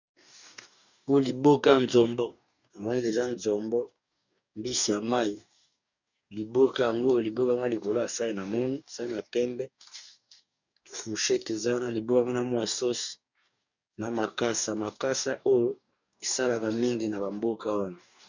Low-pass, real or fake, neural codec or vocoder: 7.2 kHz; fake; codec, 44.1 kHz, 2.6 kbps, DAC